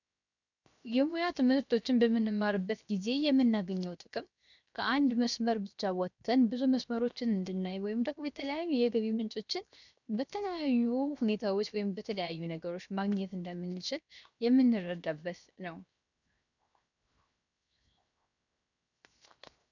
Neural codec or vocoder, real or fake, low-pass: codec, 16 kHz, 0.7 kbps, FocalCodec; fake; 7.2 kHz